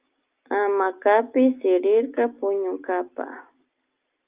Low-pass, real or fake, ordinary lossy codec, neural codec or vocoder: 3.6 kHz; real; Opus, 32 kbps; none